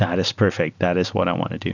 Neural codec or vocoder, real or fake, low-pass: none; real; 7.2 kHz